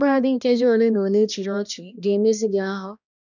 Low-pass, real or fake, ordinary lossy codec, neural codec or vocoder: 7.2 kHz; fake; none; codec, 16 kHz, 1 kbps, X-Codec, HuBERT features, trained on balanced general audio